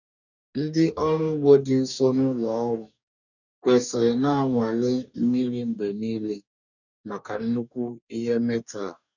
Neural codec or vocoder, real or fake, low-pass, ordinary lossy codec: codec, 44.1 kHz, 2.6 kbps, DAC; fake; 7.2 kHz; none